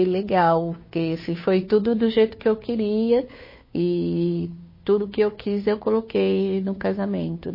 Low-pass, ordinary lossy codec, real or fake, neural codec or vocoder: 5.4 kHz; MP3, 24 kbps; fake; codec, 16 kHz, 2 kbps, FunCodec, trained on Chinese and English, 25 frames a second